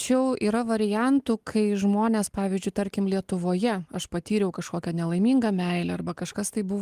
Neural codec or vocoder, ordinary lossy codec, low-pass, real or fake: none; Opus, 24 kbps; 14.4 kHz; real